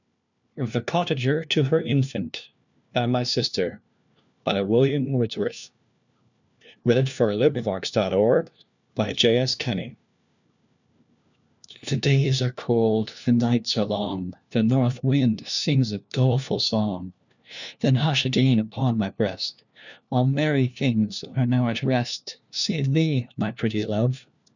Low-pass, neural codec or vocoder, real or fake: 7.2 kHz; codec, 16 kHz, 1 kbps, FunCodec, trained on LibriTTS, 50 frames a second; fake